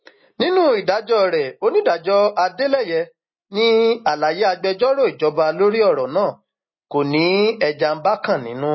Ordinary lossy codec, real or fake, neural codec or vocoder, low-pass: MP3, 24 kbps; real; none; 7.2 kHz